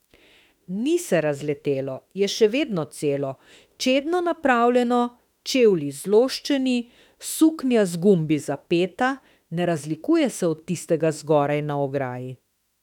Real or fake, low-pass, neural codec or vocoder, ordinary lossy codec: fake; 19.8 kHz; autoencoder, 48 kHz, 32 numbers a frame, DAC-VAE, trained on Japanese speech; none